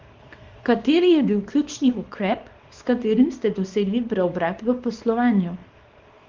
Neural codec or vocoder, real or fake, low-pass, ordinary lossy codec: codec, 24 kHz, 0.9 kbps, WavTokenizer, small release; fake; 7.2 kHz; Opus, 32 kbps